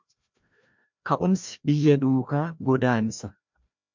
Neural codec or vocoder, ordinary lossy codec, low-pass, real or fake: codec, 16 kHz, 1 kbps, FreqCodec, larger model; MP3, 64 kbps; 7.2 kHz; fake